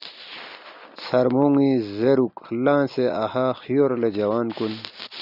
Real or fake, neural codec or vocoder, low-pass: real; none; 5.4 kHz